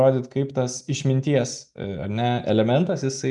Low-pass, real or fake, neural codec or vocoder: 10.8 kHz; real; none